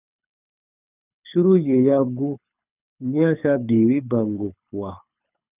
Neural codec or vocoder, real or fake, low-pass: codec, 24 kHz, 6 kbps, HILCodec; fake; 3.6 kHz